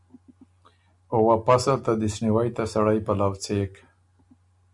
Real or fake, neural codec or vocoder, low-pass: real; none; 10.8 kHz